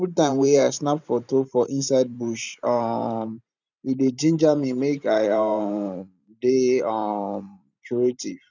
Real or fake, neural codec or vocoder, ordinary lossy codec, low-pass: fake; vocoder, 44.1 kHz, 128 mel bands every 512 samples, BigVGAN v2; none; 7.2 kHz